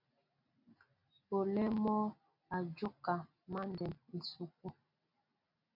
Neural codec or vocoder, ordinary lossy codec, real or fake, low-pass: none; MP3, 48 kbps; real; 5.4 kHz